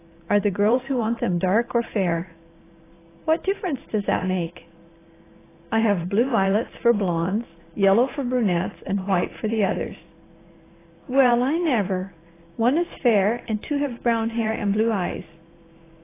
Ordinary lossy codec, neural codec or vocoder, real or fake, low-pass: AAC, 16 kbps; vocoder, 44.1 kHz, 128 mel bands every 512 samples, BigVGAN v2; fake; 3.6 kHz